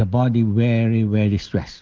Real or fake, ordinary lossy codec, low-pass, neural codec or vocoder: real; Opus, 32 kbps; 7.2 kHz; none